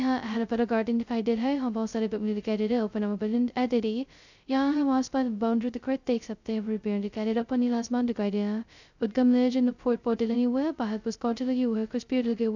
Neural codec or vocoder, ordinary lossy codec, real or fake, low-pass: codec, 16 kHz, 0.2 kbps, FocalCodec; none; fake; 7.2 kHz